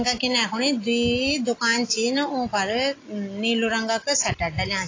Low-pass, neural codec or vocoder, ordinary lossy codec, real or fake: 7.2 kHz; none; AAC, 32 kbps; real